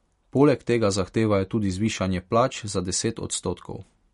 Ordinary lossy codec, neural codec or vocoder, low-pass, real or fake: MP3, 48 kbps; none; 19.8 kHz; real